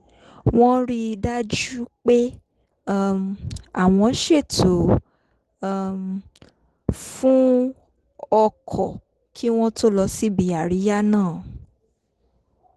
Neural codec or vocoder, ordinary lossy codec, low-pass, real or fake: none; Opus, 16 kbps; 10.8 kHz; real